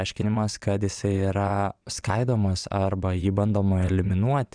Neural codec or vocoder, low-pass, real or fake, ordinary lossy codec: vocoder, 22.05 kHz, 80 mel bands, WaveNeXt; 9.9 kHz; fake; Opus, 64 kbps